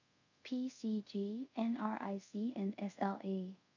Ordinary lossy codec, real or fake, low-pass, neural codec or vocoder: none; fake; 7.2 kHz; codec, 24 kHz, 0.5 kbps, DualCodec